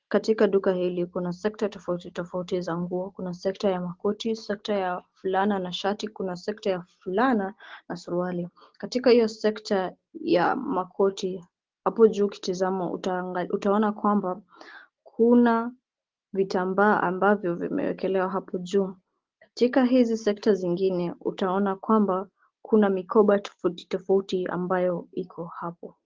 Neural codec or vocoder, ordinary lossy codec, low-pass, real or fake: none; Opus, 16 kbps; 7.2 kHz; real